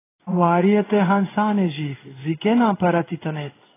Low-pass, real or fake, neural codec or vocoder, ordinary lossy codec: 3.6 kHz; fake; codec, 16 kHz in and 24 kHz out, 1 kbps, XY-Tokenizer; AAC, 16 kbps